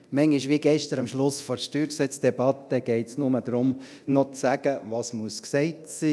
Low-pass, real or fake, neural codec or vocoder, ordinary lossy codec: none; fake; codec, 24 kHz, 0.9 kbps, DualCodec; none